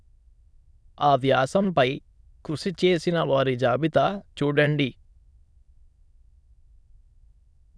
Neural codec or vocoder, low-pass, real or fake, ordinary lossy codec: autoencoder, 22.05 kHz, a latent of 192 numbers a frame, VITS, trained on many speakers; none; fake; none